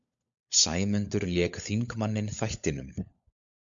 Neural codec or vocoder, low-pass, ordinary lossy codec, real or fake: codec, 16 kHz, 16 kbps, FunCodec, trained on LibriTTS, 50 frames a second; 7.2 kHz; MP3, 96 kbps; fake